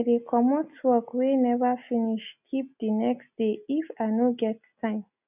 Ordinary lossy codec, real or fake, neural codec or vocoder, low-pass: none; real; none; 3.6 kHz